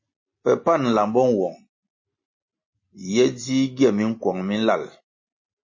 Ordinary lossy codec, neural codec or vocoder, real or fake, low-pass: MP3, 32 kbps; none; real; 7.2 kHz